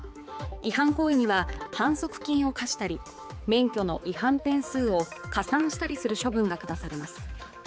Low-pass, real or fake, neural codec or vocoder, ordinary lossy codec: none; fake; codec, 16 kHz, 4 kbps, X-Codec, HuBERT features, trained on balanced general audio; none